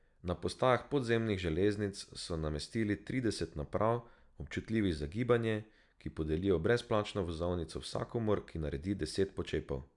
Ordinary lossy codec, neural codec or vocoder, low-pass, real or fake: none; none; 10.8 kHz; real